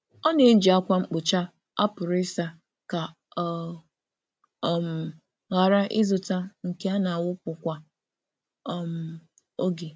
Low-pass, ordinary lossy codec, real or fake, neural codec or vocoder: none; none; real; none